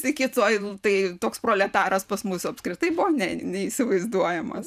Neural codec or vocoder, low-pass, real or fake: vocoder, 44.1 kHz, 128 mel bands every 512 samples, BigVGAN v2; 14.4 kHz; fake